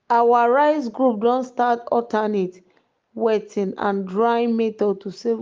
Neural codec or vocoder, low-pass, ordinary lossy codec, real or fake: none; 7.2 kHz; Opus, 32 kbps; real